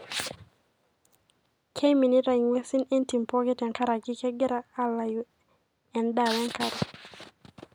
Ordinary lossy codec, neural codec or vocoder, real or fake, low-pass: none; none; real; none